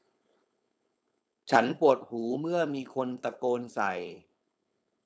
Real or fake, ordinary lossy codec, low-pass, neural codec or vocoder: fake; none; none; codec, 16 kHz, 4.8 kbps, FACodec